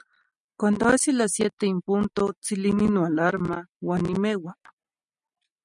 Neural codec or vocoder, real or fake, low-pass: none; real; 10.8 kHz